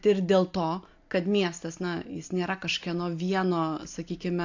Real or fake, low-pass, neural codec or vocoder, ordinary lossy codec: real; 7.2 kHz; none; AAC, 48 kbps